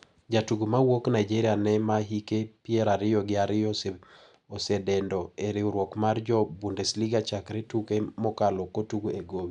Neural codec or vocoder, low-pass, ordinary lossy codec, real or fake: none; 10.8 kHz; none; real